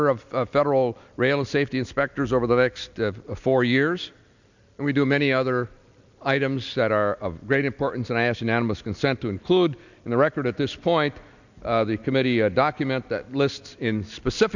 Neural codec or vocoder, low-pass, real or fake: none; 7.2 kHz; real